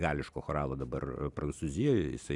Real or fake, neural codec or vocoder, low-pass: real; none; 10.8 kHz